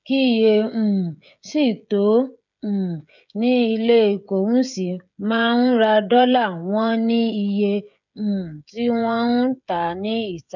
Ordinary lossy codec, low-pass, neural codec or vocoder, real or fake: none; 7.2 kHz; codec, 16 kHz, 16 kbps, FreqCodec, smaller model; fake